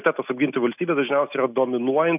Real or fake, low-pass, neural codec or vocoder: real; 3.6 kHz; none